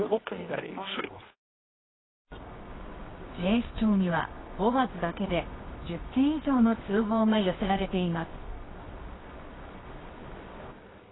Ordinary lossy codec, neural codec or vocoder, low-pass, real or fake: AAC, 16 kbps; codec, 24 kHz, 0.9 kbps, WavTokenizer, medium music audio release; 7.2 kHz; fake